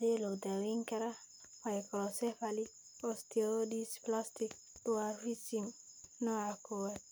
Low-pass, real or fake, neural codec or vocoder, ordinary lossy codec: none; real; none; none